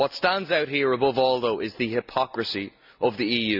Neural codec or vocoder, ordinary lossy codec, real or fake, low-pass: none; none; real; 5.4 kHz